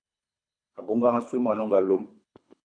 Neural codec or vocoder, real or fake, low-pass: codec, 24 kHz, 3 kbps, HILCodec; fake; 9.9 kHz